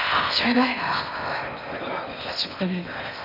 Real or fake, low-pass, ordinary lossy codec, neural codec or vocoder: fake; 5.4 kHz; none; codec, 16 kHz in and 24 kHz out, 0.6 kbps, FocalCodec, streaming, 4096 codes